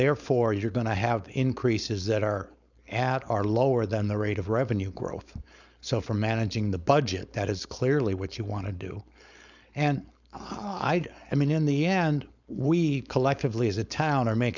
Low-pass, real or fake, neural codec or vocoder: 7.2 kHz; fake; codec, 16 kHz, 4.8 kbps, FACodec